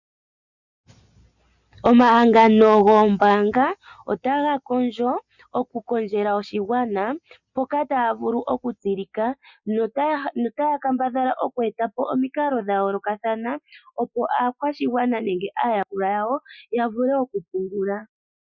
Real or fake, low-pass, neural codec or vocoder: real; 7.2 kHz; none